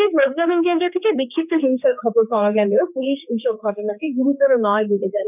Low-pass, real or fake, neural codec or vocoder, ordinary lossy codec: 3.6 kHz; fake; codec, 16 kHz, 2 kbps, X-Codec, HuBERT features, trained on general audio; none